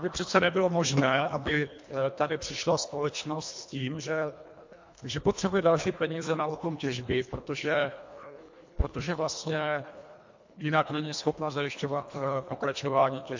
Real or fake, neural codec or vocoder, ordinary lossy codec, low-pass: fake; codec, 24 kHz, 1.5 kbps, HILCodec; MP3, 48 kbps; 7.2 kHz